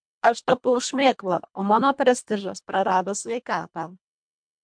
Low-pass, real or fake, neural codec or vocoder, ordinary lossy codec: 9.9 kHz; fake; codec, 24 kHz, 1.5 kbps, HILCodec; MP3, 64 kbps